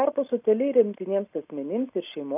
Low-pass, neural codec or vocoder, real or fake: 3.6 kHz; none; real